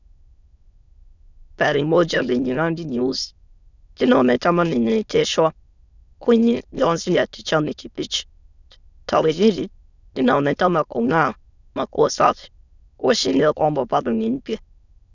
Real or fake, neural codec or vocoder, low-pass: fake; autoencoder, 22.05 kHz, a latent of 192 numbers a frame, VITS, trained on many speakers; 7.2 kHz